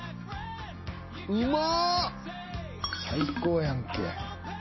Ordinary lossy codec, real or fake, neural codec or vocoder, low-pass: MP3, 24 kbps; real; none; 7.2 kHz